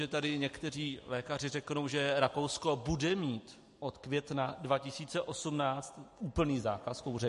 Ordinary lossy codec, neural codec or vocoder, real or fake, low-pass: MP3, 48 kbps; none; real; 14.4 kHz